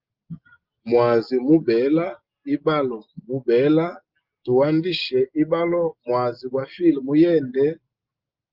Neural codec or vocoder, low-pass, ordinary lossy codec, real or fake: none; 5.4 kHz; Opus, 32 kbps; real